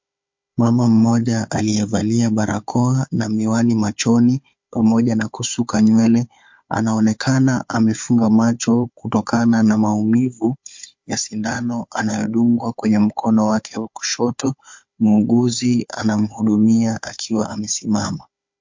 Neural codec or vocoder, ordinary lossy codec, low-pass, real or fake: codec, 16 kHz, 4 kbps, FunCodec, trained on Chinese and English, 50 frames a second; MP3, 48 kbps; 7.2 kHz; fake